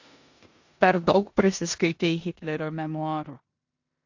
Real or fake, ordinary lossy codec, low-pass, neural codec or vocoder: fake; AAC, 48 kbps; 7.2 kHz; codec, 16 kHz in and 24 kHz out, 0.9 kbps, LongCat-Audio-Codec, four codebook decoder